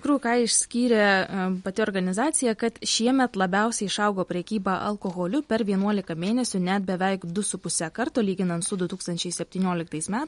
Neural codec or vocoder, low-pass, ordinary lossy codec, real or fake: none; 14.4 kHz; MP3, 48 kbps; real